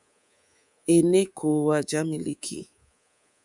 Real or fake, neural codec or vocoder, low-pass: fake; codec, 24 kHz, 3.1 kbps, DualCodec; 10.8 kHz